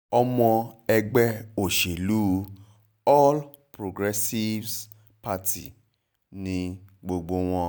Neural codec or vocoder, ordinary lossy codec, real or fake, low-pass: none; none; real; none